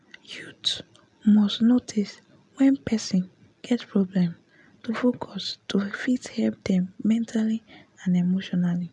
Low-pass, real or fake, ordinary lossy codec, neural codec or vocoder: 10.8 kHz; real; none; none